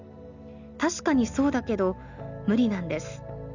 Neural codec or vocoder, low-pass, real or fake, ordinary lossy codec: none; 7.2 kHz; real; none